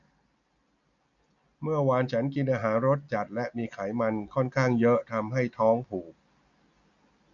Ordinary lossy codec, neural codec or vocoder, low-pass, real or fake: none; none; 7.2 kHz; real